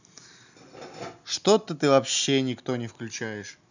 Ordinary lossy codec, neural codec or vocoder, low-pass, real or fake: none; none; 7.2 kHz; real